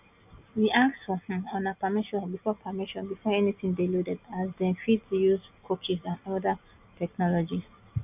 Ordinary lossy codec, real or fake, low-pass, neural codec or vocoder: none; real; 3.6 kHz; none